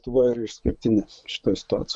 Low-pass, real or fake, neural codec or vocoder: 10.8 kHz; fake; vocoder, 24 kHz, 100 mel bands, Vocos